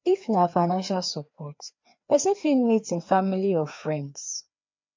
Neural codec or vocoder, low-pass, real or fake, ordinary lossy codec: codec, 16 kHz, 2 kbps, FreqCodec, larger model; 7.2 kHz; fake; MP3, 48 kbps